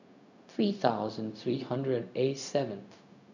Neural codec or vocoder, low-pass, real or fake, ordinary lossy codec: codec, 16 kHz, 0.4 kbps, LongCat-Audio-Codec; 7.2 kHz; fake; none